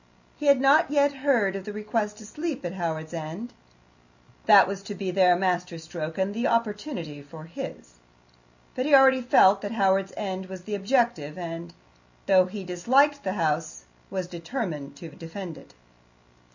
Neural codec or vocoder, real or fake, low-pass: none; real; 7.2 kHz